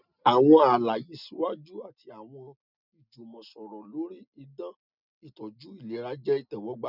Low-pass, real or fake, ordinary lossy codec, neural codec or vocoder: 5.4 kHz; real; none; none